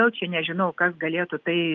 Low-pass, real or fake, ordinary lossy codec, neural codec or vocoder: 7.2 kHz; real; Opus, 32 kbps; none